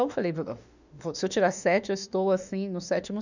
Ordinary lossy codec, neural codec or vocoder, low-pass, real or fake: none; autoencoder, 48 kHz, 32 numbers a frame, DAC-VAE, trained on Japanese speech; 7.2 kHz; fake